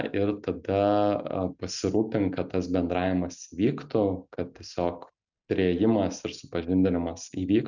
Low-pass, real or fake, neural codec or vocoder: 7.2 kHz; real; none